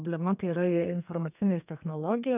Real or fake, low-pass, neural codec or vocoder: fake; 3.6 kHz; codec, 32 kHz, 1.9 kbps, SNAC